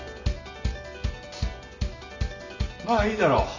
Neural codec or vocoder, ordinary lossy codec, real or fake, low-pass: none; Opus, 64 kbps; real; 7.2 kHz